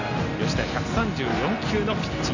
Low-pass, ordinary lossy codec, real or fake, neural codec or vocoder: 7.2 kHz; none; real; none